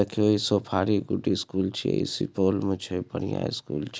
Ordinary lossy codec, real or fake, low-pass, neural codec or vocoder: none; real; none; none